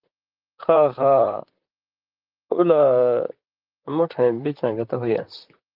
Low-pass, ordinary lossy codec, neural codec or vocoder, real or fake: 5.4 kHz; Opus, 32 kbps; vocoder, 44.1 kHz, 128 mel bands, Pupu-Vocoder; fake